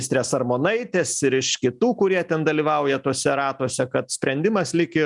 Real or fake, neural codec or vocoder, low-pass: real; none; 10.8 kHz